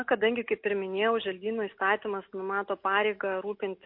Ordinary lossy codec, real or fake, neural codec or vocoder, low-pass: MP3, 32 kbps; real; none; 5.4 kHz